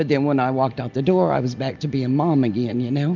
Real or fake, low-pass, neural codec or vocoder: real; 7.2 kHz; none